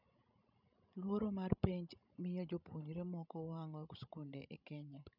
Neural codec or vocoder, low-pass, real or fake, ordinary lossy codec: codec, 16 kHz, 16 kbps, FreqCodec, larger model; 5.4 kHz; fake; none